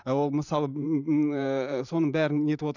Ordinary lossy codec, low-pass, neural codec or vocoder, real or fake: none; 7.2 kHz; vocoder, 44.1 kHz, 128 mel bands every 512 samples, BigVGAN v2; fake